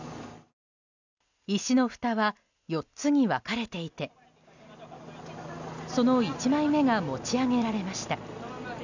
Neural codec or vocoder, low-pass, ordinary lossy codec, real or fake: none; 7.2 kHz; none; real